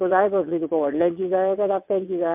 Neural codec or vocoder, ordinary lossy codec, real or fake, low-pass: vocoder, 22.05 kHz, 80 mel bands, WaveNeXt; MP3, 32 kbps; fake; 3.6 kHz